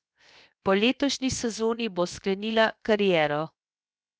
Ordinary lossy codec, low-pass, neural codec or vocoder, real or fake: none; none; codec, 16 kHz, 0.7 kbps, FocalCodec; fake